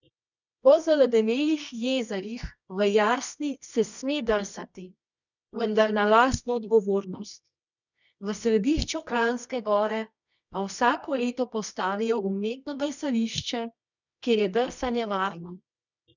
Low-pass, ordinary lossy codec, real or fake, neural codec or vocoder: 7.2 kHz; none; fake; codec, 24 kHz, 0.9 kbps, WavTokenizer, medium music audio release